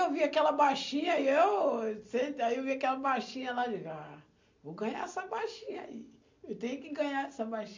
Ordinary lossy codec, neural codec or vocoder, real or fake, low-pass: MP3, 64 kbps; none; real; 7.2 kHz